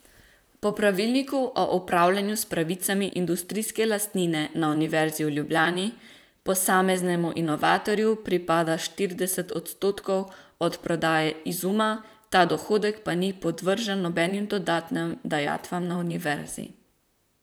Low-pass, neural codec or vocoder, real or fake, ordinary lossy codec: none; vocoder, 44.1 kHz, 128 mel bands, Pupu-Vocoder; fake; none